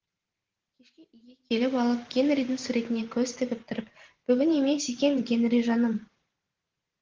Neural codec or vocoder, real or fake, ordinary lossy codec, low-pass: vocoder, 44.1 kHz, 128 mel bands every 512 samples, BigVGAN v2; fake; Opus, 16 kbps; 7.2 kHz